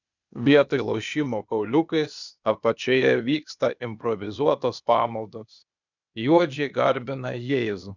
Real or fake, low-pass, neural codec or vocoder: fake; 7.2 kHz; codec, 16 kHz, 0.8 kbps, ZipCodec